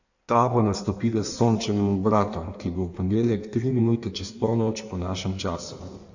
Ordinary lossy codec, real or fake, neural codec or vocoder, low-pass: none; fake; codec, 16 kHz in and 24 kHz out, 1.1 kbps, FireRedTTS-2 codec; 7.2 kHz